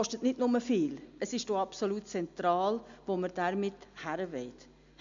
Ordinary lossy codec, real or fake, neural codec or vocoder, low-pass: MP3, 64 kbps; real; none; 7.2 kHz